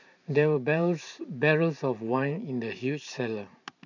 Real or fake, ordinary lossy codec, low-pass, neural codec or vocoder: fake; none; 7.2 kHz; vocoder, 44.1 kHz, 128 mel bands every 512 samples, BigVGAN v2